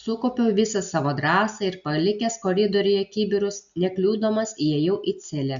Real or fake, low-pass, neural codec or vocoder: real; 7.2 kHz; none